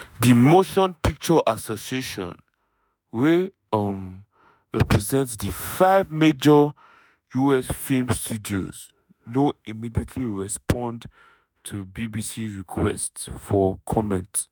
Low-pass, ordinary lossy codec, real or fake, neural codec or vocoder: none; none; fake; autoencoder, 48 kHz, 32 numbers a frame, DAC-VAE, trained on Japanese speech